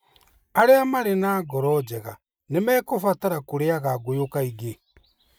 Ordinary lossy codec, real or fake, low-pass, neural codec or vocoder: none; real; none; none